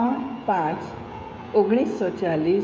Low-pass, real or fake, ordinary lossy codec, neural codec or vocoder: none; fake; none; codec, 16 kHz, 16 kbps, FreqCodec, smaller model